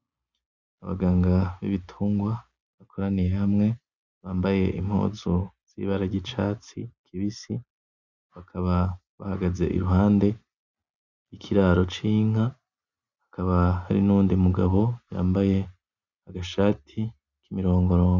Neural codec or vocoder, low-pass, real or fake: none; 7.2 kHz; real